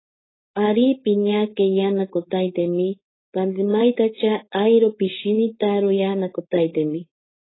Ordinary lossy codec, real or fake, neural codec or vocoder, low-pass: AAC, 16 kbps; fake; codec, 16 kHz, 4.8 kbps, FACodec; 7.2 kHz